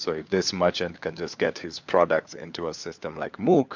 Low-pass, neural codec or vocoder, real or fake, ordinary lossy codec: 7.2 kHz; codec, 16 kHz, 4 kbps, FunCodec, trained on LibriTTS, 50 frames a second; fake; MP3, 64 kbps